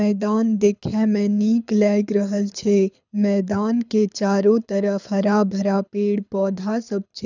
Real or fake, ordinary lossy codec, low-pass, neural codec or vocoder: fake; none; 7.2 kHz; codec, 24 kHz, 6 kbps, HILCodec